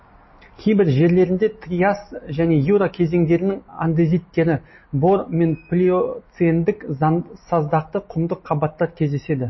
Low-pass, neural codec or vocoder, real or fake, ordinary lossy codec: 7.2 kHz; none; real; MP3, 24 kbps